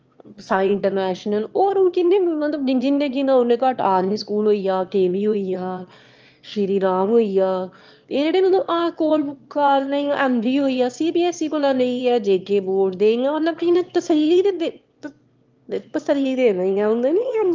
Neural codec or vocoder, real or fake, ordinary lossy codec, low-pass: autoencoder, 22.05 kHz, a latent of 192 numbers a frame, VITS, trained on one speaker; fake; Opus, 24 kbps; 7.2 kHz